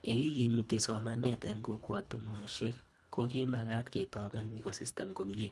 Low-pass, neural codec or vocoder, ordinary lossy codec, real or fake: none; codec, 24 kHz, 1.5 kbps, HILCodec; none; fake